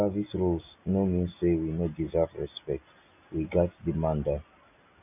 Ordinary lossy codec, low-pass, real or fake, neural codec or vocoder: none; 3.6 kHz; real; none